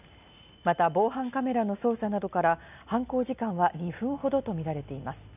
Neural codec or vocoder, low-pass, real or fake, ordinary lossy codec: none; 3.6 kHz; real; none